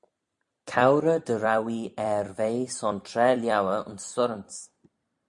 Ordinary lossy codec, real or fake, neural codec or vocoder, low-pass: MP3, 64 kbps; fake; vocoder, 44.1 kHz, 128 mel bands every 256 samples, BigVGAN v2; 10.8 kHz